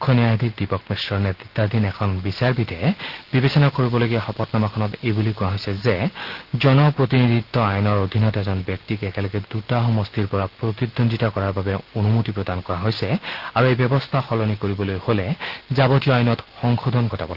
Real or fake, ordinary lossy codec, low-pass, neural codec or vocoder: real; Opus, 16 kbps; 5.4 kHz; none